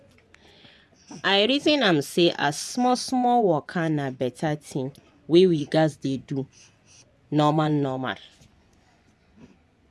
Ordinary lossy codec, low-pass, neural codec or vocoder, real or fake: none; none; vocoder, 24 kHz, 100 mel bands, Vocos; fake